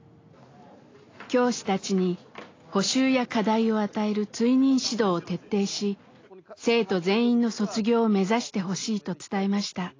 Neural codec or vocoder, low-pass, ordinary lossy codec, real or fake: none; 7.2 kHz; AAC, 32 kbps; real